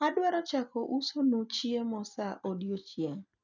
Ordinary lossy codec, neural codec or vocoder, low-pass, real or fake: none; none; 7.2 kHz; real